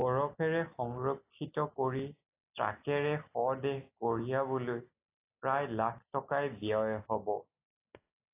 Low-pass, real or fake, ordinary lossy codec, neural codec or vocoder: 3.6 kHz; real; AAC, 24 kbps; none